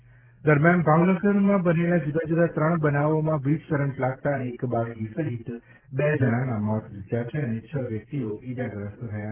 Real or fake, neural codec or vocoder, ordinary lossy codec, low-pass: real; none; Opus, 16 kbps; 3.6 kHz